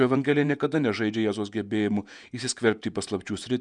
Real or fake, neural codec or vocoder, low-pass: fake; vocoder, 48 kHz, 128 mel bands, Vocos; 10.8 kHz